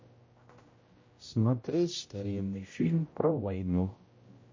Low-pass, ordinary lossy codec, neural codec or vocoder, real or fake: 7.2 kHz; MP3, 32 kbps; codec, 16 kHz, 0.5 kbps, X-Codec, HuBERT features, trained on general audio; fake